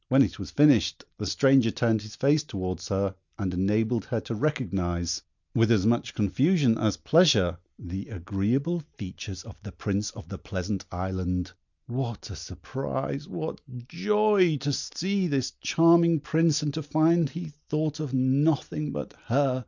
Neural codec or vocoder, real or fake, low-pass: none; real; 7.2 kHz